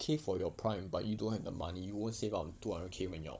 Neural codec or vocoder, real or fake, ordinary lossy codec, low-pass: codec, 16 kHz, 16 kbps, FunCodec, trained on Chinese and English, 50 frames a second; fake; none; none